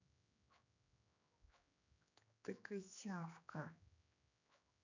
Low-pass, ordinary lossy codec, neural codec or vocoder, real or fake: 7.2 kHz; none; codec, 16 kHz, 2 kbps, X-Codec, HuBERT features, trained on general audio; fake